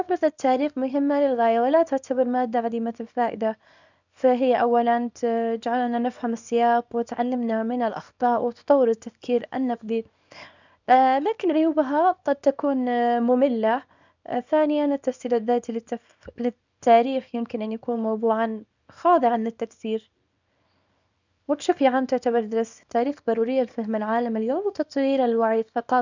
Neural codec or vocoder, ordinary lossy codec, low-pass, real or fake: codec, 24 kHz, 0.9 kbps, WavTokenizer, small release; none; 7.2 kHz; fake